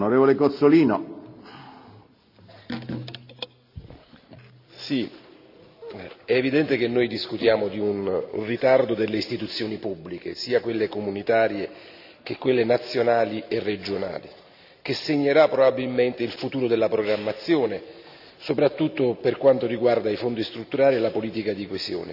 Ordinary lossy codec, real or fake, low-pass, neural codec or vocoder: none; real; 5.4 kHz; none